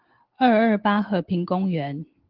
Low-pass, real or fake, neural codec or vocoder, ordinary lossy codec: 5.4 kHz; real; none; Opus, 16 kbps